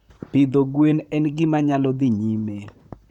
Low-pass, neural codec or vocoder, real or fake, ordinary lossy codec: 19.8 kHz; vocoder, 44.1 kHz, 128 mel bands, Pupu-Vocoder; fake; none